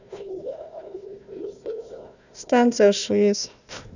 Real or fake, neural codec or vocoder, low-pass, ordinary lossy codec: fake; codec, 16 kHz, 1 kbps, FunCodec, trained on Chinese and English, 50 frames a second; 7.2 kHz; none